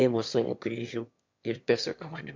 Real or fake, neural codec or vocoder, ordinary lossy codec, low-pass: fake; autoencoder, 22.05 kHz, a latent of 192 numbers a frame, VITS, trained on one speaker; MP3, 48 kbps; 7.2 kHz